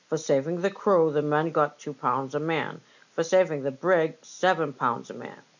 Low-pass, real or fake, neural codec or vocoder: 7.2 kHz; real; none